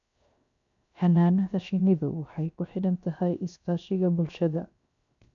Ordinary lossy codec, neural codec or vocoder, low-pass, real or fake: none; codec, 16 kHz, 0.7 kbps, FocalCodec; 7.2 kHz; fake